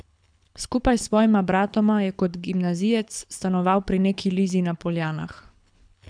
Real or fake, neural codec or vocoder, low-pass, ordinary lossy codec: fake; codec, 24 kHz, 6 kbps, HILCodec; 9.9 kHz; none